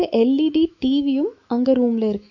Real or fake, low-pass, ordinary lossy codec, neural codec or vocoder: fake; 7.2 kHz; AAC, 48 kbps; autoencoder, 48 kHz, 128 numbers a frame, DAC-VAE, trained on Japanese speech